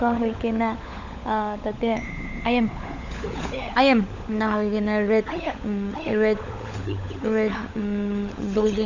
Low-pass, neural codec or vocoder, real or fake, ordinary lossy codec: 7.2 kHz; codec, 16 kHz, 16 kbps, FunCodec, trained on LibriTTS, 50 frames a second; fake; none